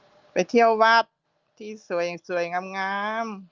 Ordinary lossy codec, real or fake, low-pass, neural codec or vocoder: Opus, 32 kbps; real; 7.2 kHz; none